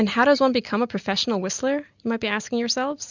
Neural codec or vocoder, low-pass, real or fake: none; 7.2 kHz; real